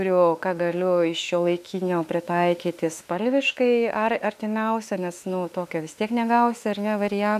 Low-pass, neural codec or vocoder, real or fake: 14.4 kHz; autoencoder, 48 kHz, 32 numbers a frame, DAC-VAE, trained on Japanese speech; fake